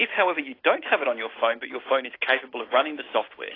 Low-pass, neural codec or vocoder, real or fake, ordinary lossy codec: 5.4 kHz; none; real; AAC, 24 kbps